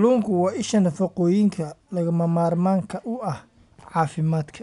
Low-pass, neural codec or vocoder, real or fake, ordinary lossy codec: 10.8 kHz; none; real; none